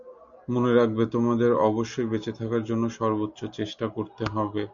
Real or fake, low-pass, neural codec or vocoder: real; 7.2 kHz; none